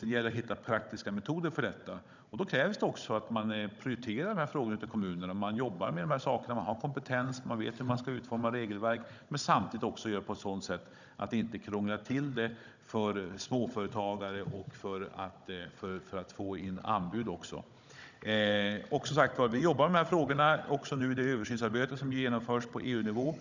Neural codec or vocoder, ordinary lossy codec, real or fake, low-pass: codec, 16 kHz, 16 kbps, FunCodec, trained on Chinese and English, 50 frames a second; none; fake; 7.2 kHz